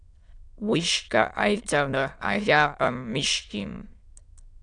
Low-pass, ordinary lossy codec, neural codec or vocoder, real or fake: 9.9 kHz; AAC, 64 kbps; autoencoder, 22.05 kHz, a latent of 192 numbers a frame, VITS, trained on many speakers; fake